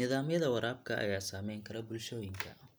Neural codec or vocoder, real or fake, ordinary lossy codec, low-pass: none; real; none; none